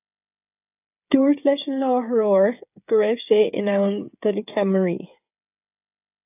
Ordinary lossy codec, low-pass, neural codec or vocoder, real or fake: AAC, 32 kbps; 3.6 kHz; codec, 16 kHz, 8 kbps, FreqCodec, smaller model; fake